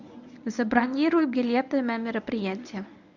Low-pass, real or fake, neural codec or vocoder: 7.2 kHz; fake; codec, 24 kHz, 0.9 kbps, WavTokenizer, medium speech release version 1